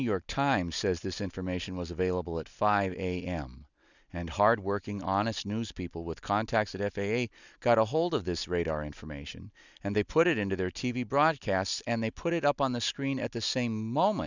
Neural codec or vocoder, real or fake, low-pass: none; real; 7.2 kHz